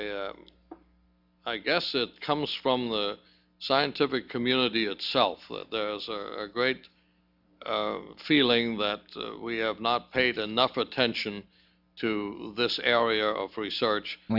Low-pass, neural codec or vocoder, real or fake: 5.4 kHz; none; real